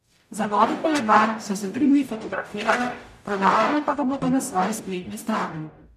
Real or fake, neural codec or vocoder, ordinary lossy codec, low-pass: fake; codec, 44.1 kHz, 0.9 kbps, DAC; none; 14.4 kHz